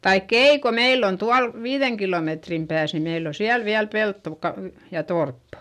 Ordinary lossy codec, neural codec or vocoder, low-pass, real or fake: none; none; 14.4 kHz; real